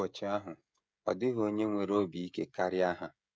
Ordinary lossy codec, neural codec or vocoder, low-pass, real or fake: none; codec, 16 kHz, 16 kbps, FreqCodec, smaller model; none; fake